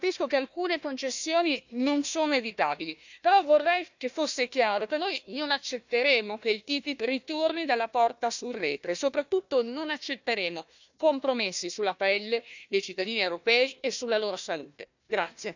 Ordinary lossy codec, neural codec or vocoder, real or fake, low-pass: none; codec, 16 kHz, 1 kbps, FunCodec, trained on Chinese and English, 50 frames a second; fake; 7.2 kHz